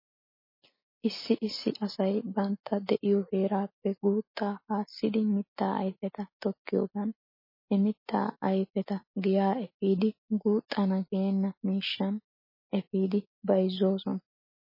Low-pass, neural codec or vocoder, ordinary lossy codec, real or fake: 5.4 kHz; none; MP3, 24 kbps; real